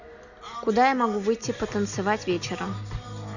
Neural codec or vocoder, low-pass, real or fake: none; 7.2 kHz; real